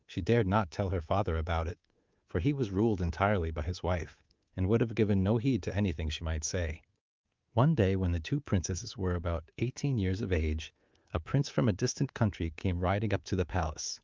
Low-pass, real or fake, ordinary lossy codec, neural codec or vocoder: 7.2 kHz; fake; Opus, 32 kbps; codec, 24 kHz, 3.1 kbps, DualCodec